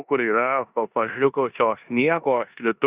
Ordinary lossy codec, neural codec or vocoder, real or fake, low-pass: Opus, 64 kbps; codec, 16 kHz in and 24 kHz out, 0.9 kbps, LongCat-Audio-Codec, four codebook decoder; fake; 3.6 kHz